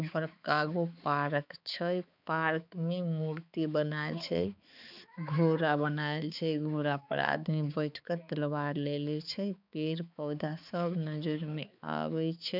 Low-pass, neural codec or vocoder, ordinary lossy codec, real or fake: 5.4 kHz; codec, 16 kHz, 4 kbps, X-Codec, HuBERT features, trained on balanced general audio; none; fake